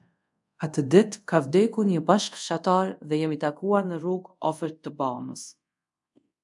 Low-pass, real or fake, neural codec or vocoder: 10.8 kHz; fake; codec, 24 kHz, 0.5 kbps, DualCodec